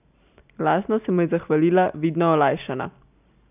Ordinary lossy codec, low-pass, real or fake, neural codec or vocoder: none; 3.6 kHz; real; none